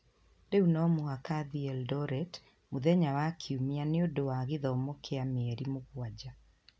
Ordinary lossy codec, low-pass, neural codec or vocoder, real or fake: none; none; none; real